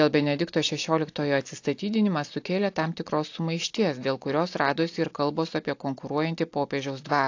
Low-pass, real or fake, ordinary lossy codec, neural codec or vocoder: 7.2 kHz; real; AAC, 48 kbps; none